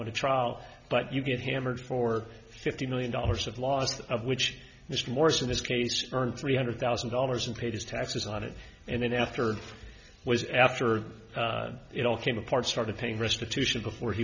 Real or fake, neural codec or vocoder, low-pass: real; none; 7.2 kHz